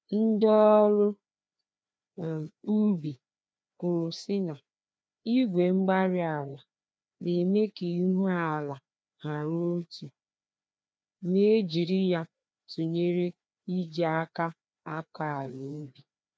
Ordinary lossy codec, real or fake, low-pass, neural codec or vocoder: none; fake; none; codec, 16 kHz, 2 kbps, FreqCodec, larger model